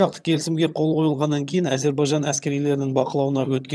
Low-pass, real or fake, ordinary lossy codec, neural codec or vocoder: none; fake; none; vocoder, 22.05 kHz, 80 mel bands, HiFi-GAN